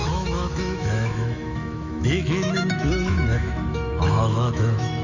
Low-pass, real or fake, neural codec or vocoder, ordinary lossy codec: 7.2 kHz; fake; autoencoder, 48 kHz, 128 numbers a frame, DAC-VAE, trained on Japanese speech; none